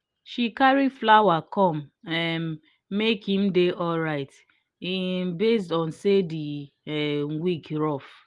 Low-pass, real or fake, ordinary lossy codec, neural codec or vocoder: 10.8 kHz; real; Opus, 24 kbps; none